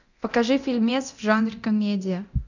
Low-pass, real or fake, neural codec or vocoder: 7.2 kHz; fake; codec, 24 kHz, 0.9 kbps, DualCodec